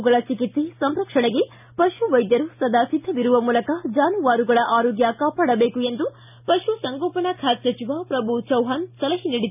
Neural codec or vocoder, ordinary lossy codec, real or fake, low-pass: none; none; real; 3.6 kHz